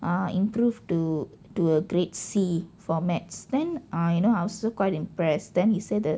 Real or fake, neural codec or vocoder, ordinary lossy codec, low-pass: real; none; none; none